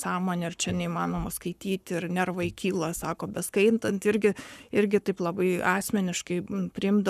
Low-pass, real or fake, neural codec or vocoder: 14.4 kHz; fake; codec, 44.1 kHz, 7.8 kbps, Pupu-Codec